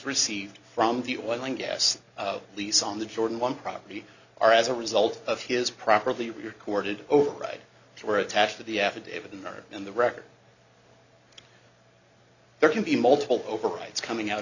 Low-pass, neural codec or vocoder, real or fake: 7.2 kHz; none; real